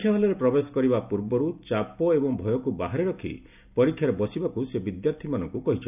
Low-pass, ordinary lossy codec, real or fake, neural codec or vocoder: 3.6 kHz; none; real; none